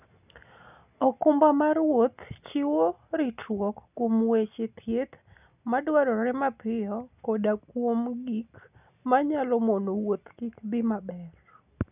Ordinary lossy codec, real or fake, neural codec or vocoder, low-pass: none; real; none; 3.6 kHz